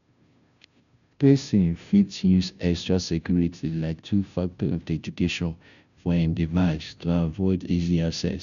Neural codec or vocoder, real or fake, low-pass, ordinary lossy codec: codec, 16 kHz, 0.5 kbps, FunCodec, trained on Chinese and English, 25 frames a second; fake; 7.2 kHz; none